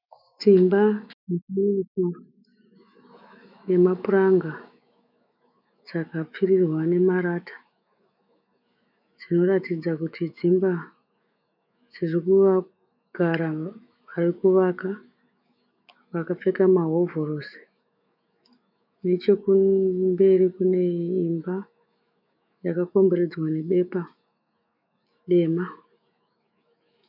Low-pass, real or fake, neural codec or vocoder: 5.4 kHz; fake; autoencoder, 48 kHz, 128 numbers a frame, DAC-VAE, trained on Japanese speech